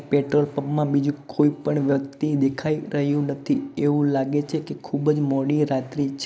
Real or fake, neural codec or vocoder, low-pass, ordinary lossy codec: real; none; none; none